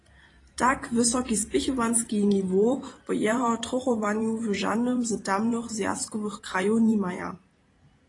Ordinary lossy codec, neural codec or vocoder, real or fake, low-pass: AAC, 32 kbps; vocoder, 44.1 kHz, 128 mel bands every 512 samples, BigVGAN v2; fake; 10.8 kHz